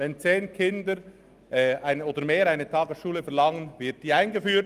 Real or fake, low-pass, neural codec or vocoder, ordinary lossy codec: real; 14.4 kHz; none; Opus, 32 kbps